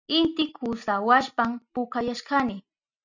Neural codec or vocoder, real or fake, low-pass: none; real; 7.2 kHz